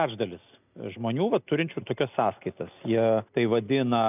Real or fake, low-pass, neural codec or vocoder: real; 3.6 kHz; none